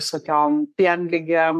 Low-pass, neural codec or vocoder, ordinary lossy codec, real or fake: 14.4 kHz; codec, 44.1 kHz, 3.4 kbps, Pupu-Codec; AAC, 96 kbps; fake